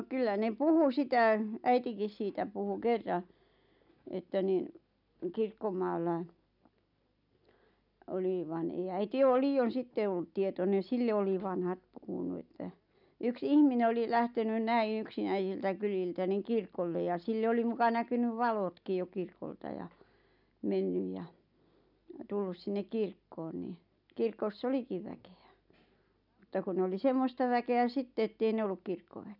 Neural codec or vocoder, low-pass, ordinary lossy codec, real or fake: none; 5.4 kHz; none; real